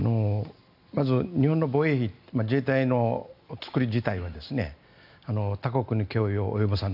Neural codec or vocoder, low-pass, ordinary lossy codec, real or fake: none; 5.4 kHz; none; real